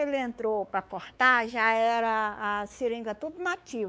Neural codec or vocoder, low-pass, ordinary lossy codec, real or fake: codec, 16 kHz, 4 kbps, X-Codec, WavLM features, trained on Multilingual LibriSpeech; none; none; fake